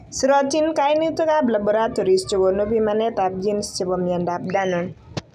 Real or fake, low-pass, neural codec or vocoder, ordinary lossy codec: real; 14.4 kHz; none; none